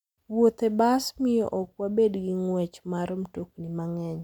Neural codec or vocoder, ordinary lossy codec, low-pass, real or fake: none; none; 19.8 kHz; real